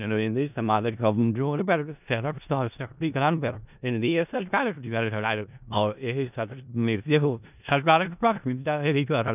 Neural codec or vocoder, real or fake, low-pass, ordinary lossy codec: codec, 16 kHz in and 24 kHz out, 0.4 kbps, LongCat-Audio-Codec, four codebook decoder; fake; 3.6 kHz; none